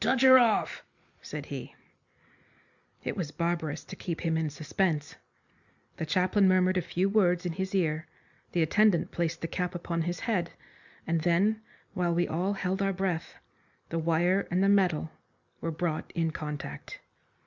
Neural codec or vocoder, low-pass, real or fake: none; 7.2 kHz; real